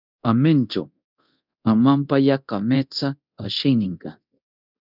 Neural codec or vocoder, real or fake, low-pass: codec, 24 kHz, 0.9 kbps, DualCodec; fake; 5.4 kHz